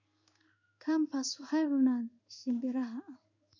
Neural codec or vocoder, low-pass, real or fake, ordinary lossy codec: codec, 16 kHz in and 24 kHz out, 1 kbps, XY-Tokenizer; 7.2 kHz; fake; none